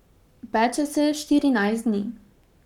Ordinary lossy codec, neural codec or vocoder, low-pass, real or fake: none; codec, 44.1 kHz, 7.8 kbps, Pupu-Codec; 19.8 kHz; fake